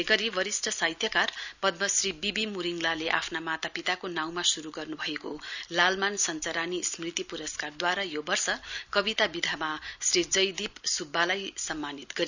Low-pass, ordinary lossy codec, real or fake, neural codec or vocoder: 7.2 kHz; none; real; none